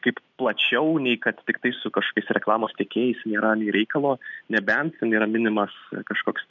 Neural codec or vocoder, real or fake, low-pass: none; real; 7.2 kHz